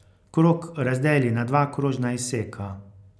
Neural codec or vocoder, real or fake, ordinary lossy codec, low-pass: none; real; none; none